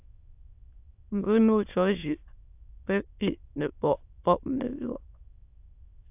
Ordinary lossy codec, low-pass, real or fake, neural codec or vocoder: AAC, 32 kbps; 3.6 kHz; fake; autoencoder, 22.05 kHz, a latent of 192 numbers a frame, VITS, trained on many speakers